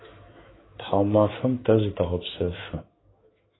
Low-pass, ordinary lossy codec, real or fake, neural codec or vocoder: 7.2 kHz; AAC, 16 kbps; fake; autoencoder, 48 kHz, 128 numbers a frame, DAC-VAE, trained on Japanese speech